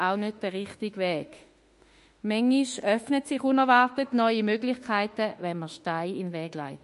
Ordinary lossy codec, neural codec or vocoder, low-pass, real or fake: MP3, 48 kbps; autoencoder, 48 kHz, 32 numbers a frame, DAC-VAE, trained on Japanese speech; 14.4 kHz; fake